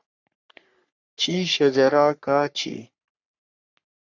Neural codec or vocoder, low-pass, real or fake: codec, 44.1 kHz, 3.4 kbps, Pupu-Codec; 7.2 kHz; fake